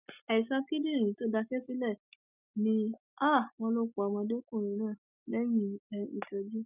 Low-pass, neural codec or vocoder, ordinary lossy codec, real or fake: 3.6 kHz; none; none; real